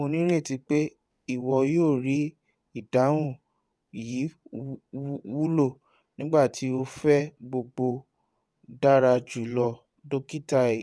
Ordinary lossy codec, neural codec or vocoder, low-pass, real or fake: none; vocoder, 22.05 kHz, 80 mel bands, WaveNeXt; none; fake